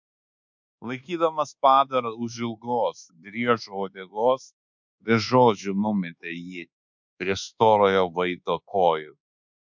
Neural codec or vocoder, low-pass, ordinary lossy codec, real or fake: codec, 24 kHz, 1.2 kbps, DualCodec; 7.2 kHz; MP3, 64 kbps; fake